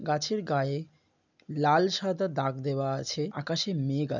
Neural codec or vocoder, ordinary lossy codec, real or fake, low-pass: none; none; real; 7.2 kHz